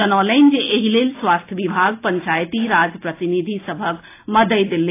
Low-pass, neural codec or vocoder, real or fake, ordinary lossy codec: 3.6 kHz; none; real; AAC, 24 kbps